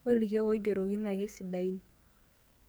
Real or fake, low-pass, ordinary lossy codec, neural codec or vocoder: fake; none; none; codec, 44.1 kHz, 2.6 kbps, SNAC